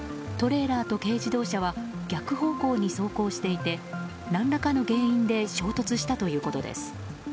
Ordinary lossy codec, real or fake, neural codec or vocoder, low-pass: none; real; none; none